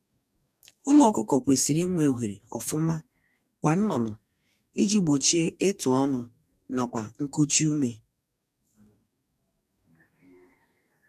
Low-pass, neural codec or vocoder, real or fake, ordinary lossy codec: 14.4 kHz; codec, 44.1 kHz, 2.6 kbps, DAC; fake; none